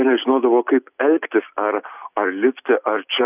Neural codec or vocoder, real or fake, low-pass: none; real; 3.6 kHz